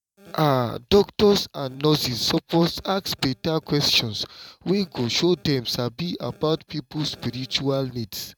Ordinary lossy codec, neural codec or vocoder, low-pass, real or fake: none; vocoder, 44.1 kHz, 128 mel bands every 512 samples, BigVGAN v2; 19.8 kHz; fake